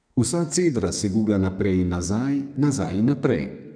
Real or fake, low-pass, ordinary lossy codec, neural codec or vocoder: fake; 9.9 kHz; none; codec, 32 kHz, 1.9 kbps, SNAC